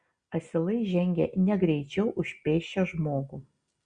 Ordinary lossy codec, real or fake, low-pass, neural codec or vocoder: Opus, 64 kbps; real; 9.9 kHz; none